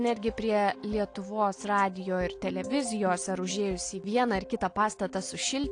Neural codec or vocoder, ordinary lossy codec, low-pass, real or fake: none; AAC, 48 kbps; 9.9 kHz; real